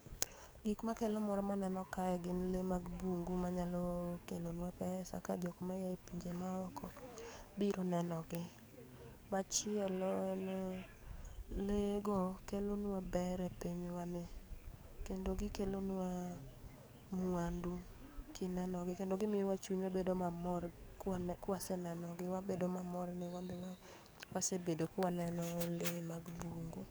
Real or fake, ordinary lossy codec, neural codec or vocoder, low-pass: fake; none; codec, 44.1 kHz, 7.8 kbps, DAC; none